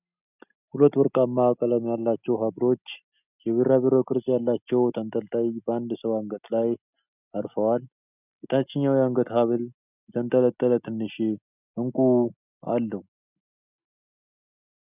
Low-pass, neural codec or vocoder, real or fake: 3.6 kHz; none; real